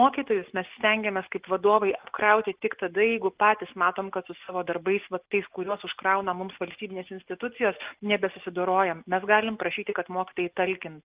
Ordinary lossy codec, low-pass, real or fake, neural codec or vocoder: Opus, 32 kbps; 3.6 kHz; real; none